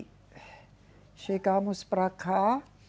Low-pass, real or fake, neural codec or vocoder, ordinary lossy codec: none; real; none; none